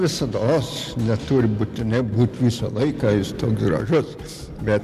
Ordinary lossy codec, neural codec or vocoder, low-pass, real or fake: MP3, 96 kbps; none; 14.4 kHz; real